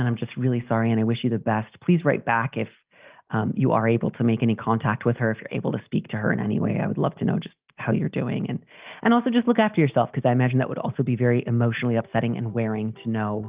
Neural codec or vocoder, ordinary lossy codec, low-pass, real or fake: none; Opus, 32 kbps; 3.6 kHz; real